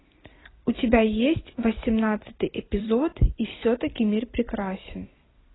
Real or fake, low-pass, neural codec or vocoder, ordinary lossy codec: fake; 7.2 kHz; vocoder, 44.1 kHz, 128 mel bands every 256 samples, BigVGAN v2; AAC, 16 kbps